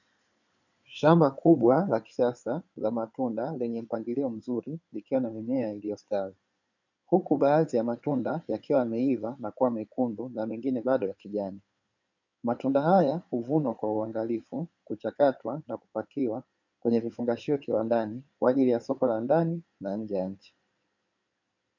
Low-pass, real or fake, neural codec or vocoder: 7.2 kHz; fake; codec, 16 kHz in and 24 kHz out, 2.2 kbps, FireRedTTS-2 codec